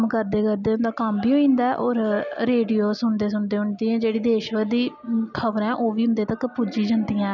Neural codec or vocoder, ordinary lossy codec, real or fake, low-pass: none; none; real; 7.2 kHz